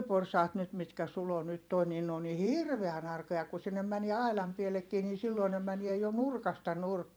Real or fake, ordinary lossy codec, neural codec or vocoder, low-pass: fake; none; vocoder, 44.1 kHz, 128 mel bands every 512 samples, BigVGAN v2; none